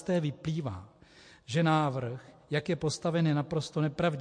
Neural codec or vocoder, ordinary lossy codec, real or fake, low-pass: none; MP3, 48 kbps; real; 9.9 kHz